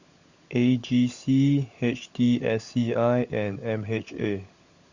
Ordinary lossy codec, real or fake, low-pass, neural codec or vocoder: Opus, 64 kbps; fake; 7.2 kHz; codec, 16 kHz, 16 kbps, FunCodec, trained on LibriTTS, 50 frames a second